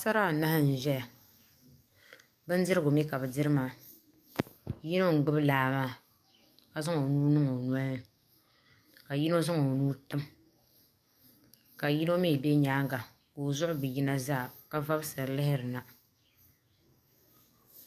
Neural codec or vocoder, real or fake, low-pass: codec, 44.1 kHz, 7.8 kbps, DAC; fake; 14.4 kHz